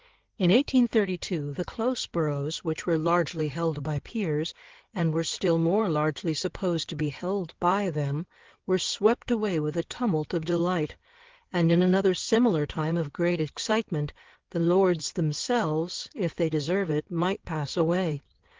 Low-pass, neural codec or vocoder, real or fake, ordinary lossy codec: 7.2 kHz; codec, 16 kHz in and 24 kHz out, 2.2 kbps, FireRedTTS-2 codec; fake; Opus, 16 kbps